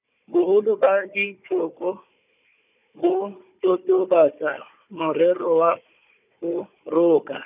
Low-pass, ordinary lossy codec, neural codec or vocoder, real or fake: 3.6 kHz; none; codec, 16 kHz, 4 kbps, FunCodec, trained on Chinese and English, 50 frames a second; fake